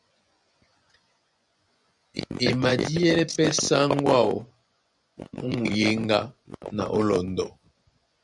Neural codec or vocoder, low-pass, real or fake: vocoder, 24 kHz, 100 mel bands, Vocos; 10.8 kHz; fake